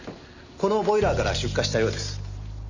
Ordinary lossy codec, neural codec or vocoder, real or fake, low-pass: none; none; real; 7.2 kHz